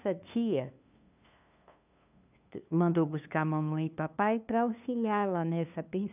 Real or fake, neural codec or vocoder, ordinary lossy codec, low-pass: fake; codec, 16 kHz, 2 kbps, FunCodec, trained on LibriTTS, 25 frames a second; none; 3.6 kHz